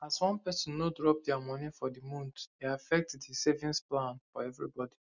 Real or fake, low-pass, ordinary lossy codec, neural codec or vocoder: real; 7.2 kHz; none; none